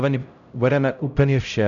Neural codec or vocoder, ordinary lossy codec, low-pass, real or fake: codec, 16 kHz, 0.5 kbps, X-Codec, HuBERT features, trained on LibriSpeech; MP3, 96 kbps; 7.2 kHz; fake